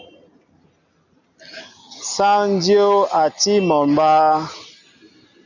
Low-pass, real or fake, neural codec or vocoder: 7.2 kHz; real; none